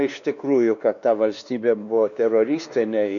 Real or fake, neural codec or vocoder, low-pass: fake; codec, 16 kHz, 2 kbps, X-Codec, WavLM features, trained on Multilingual LibriSpeech; 7.2 kHz